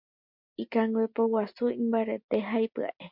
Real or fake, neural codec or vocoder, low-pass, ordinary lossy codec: real; none; 5.4 kHz; MP3, 48 kbps